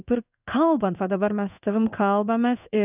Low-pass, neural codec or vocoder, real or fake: 3.6 kHz; codec, 16 kHz in and 24 kHz out, 1 kbps, XY-Tokenizer; fake